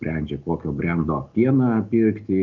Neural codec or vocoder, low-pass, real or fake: none; 7.2 kHz; real